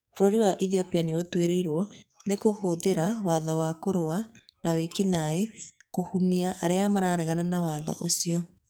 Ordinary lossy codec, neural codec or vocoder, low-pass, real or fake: none; codec, 44.1 kHz, 2.6 kbps, SNAC; none; fake